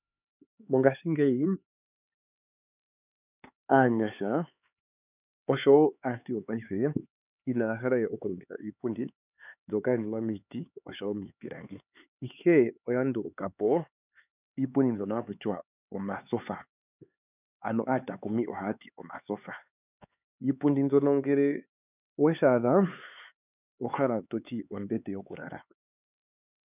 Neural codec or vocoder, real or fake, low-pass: codec, 16 kHz, 4 kbps, X-Codec, HuBERT features, trained on LibriSpeech; fake; 3.6 kHz